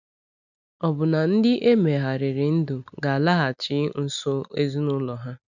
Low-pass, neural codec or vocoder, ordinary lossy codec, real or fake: 7.2 kHz; none; none; real